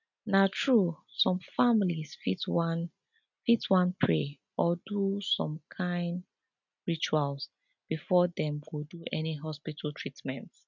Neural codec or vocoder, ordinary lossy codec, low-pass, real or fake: none; none; 7.2 kHz; real